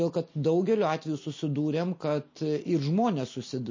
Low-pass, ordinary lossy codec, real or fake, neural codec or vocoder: 7.2 kHz; MP3, 32 kbps; real; none